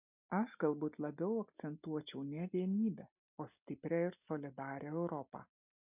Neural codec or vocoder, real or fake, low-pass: none; real; 3.6 kHz